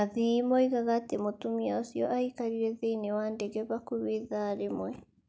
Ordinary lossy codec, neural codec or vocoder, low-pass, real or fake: none; none; none; real